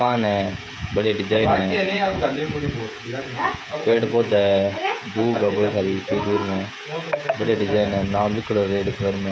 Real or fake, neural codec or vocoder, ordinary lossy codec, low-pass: fake; codec, 16 kHz, 16 kbps, FreqCodec, smaller model; none; none